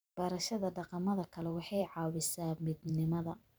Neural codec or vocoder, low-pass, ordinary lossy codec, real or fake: vocoder, 44.1 kHz, 128 mel bands every 512 samples, BigVGAN v2; none; none; fake